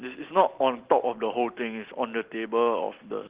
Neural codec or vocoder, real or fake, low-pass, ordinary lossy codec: none; real; 3.6 kHz; Opus, 16 kbps